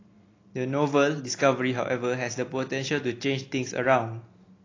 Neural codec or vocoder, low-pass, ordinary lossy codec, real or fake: none; 7.2 kHz; AAC, 32 kbps; real